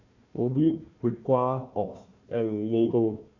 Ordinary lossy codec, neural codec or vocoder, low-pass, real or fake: none; codec, 16 kHz, 1 kbps, FunCodec, trained on Chinese and English, 50 frames a second; 7.2 kHz; fake